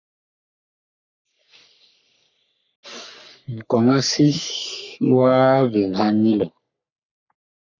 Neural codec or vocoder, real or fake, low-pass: codec, 44.1 kHz, 3.4 kbps, Pupu-Codec; fake; 7.2 kHz